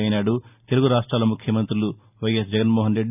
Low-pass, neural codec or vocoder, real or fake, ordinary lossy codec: 3.6 kHz; none; real; none